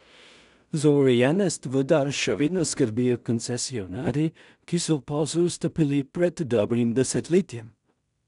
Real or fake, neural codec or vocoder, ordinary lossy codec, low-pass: fake; codec, 16 kHz in and 24 kHz out, 0.4 kbps, LongCat-Audio-Codec, two codebook decoder; none; 10.8 kHz